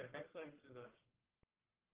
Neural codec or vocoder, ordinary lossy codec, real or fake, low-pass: codec, 44.1 kHz, 1.7 kbps, Pupu-Codec; Opus, 16 kbps; fake; 3.6 kHz